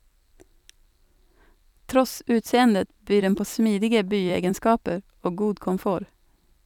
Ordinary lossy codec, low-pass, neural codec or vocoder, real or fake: none; 19.8 kHz; vocoder, 44.1 kHz, 128 mel bands every 256 samples, BigVGAN v2; fake